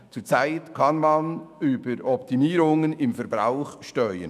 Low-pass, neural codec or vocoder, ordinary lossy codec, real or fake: 14.4 kHz; autoencoder, 48 kHz, 128 numbers a frame, DAC-VAE, trained on Japanese speech; none; fake